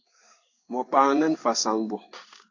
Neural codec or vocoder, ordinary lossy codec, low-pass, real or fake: codec, 16 kHz in and 24 kHz out, 1 kbps, XY-Tokenizer; AAC, 48 kbps; 7.2 kHz; fake